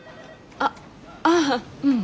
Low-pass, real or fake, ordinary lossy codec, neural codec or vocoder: none; real; none; none